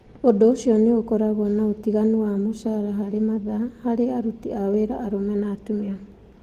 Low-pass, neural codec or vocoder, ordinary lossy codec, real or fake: 14.4 kHz; none; Opus, 24 kbps; real